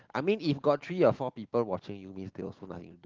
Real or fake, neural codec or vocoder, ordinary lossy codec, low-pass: real; none; Opus, 16 kbps; 7.2 kHz